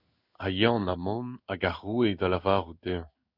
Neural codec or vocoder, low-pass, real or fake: codec, 16 kHz in and 24 kHz out, 1 kbps, XY-Tokenizer; 5.4 kHz; fake